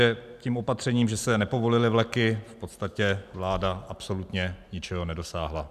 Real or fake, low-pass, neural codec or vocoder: real; 14.4 kHz; none